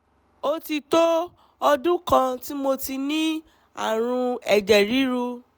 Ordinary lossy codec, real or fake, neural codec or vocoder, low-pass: none; real; none; none